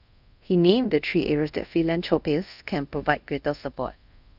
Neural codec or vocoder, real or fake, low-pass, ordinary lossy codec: codec, 24 kHz, 0.5 kbps, DualCodec; fake; 5.4 kHz; none